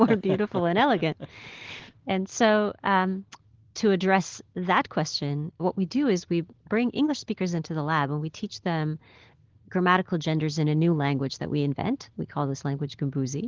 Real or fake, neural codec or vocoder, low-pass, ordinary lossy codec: real; none; 7.2 kHz; Opus, 16 kbps